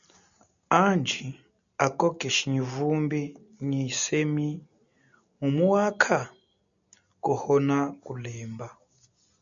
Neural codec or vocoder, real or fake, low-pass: none; real; 7.2 kHz